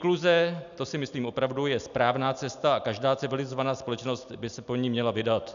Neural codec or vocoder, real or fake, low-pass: none; real; 7.2 kHz